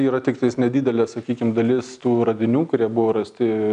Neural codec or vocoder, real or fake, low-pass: none; real; 9.9 kHz